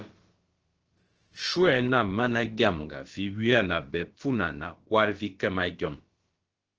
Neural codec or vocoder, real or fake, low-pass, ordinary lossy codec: codec, 16 kHz, about 1 kbps, DyCAST, with the encoder's durations; fake; 7.2 kHz; Opus, 16 kbps